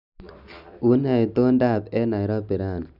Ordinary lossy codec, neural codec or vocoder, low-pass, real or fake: none; none; 5.4 kHz; real